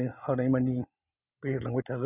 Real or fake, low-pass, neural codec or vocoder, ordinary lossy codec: real; 3.6 kHz; none; none